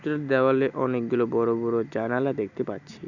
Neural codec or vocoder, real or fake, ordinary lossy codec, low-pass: none; real; none; 7.2 kHz